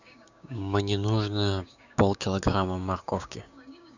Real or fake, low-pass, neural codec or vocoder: fake; 7.2 kHz; codec, 16 kHz, 6 kbps, DAC